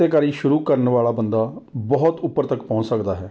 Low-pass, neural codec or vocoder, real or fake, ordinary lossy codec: none; none; real; none